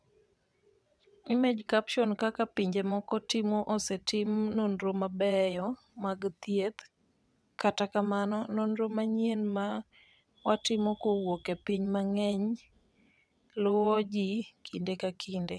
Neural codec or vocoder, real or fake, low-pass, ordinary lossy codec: vocoder, 22.05 kHz, 80 mel bands, WaveNeXt; fake; none; none